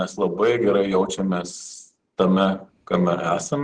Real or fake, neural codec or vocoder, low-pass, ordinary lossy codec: real; none; 9.9 kHz; Opus, 16 kbps